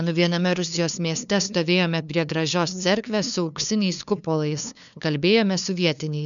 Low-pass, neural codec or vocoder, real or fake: 7.2 kHz; codec, 16 kHz, 2 kbps, FunCodec, trained on LibriTTS, 25 frames a second; fake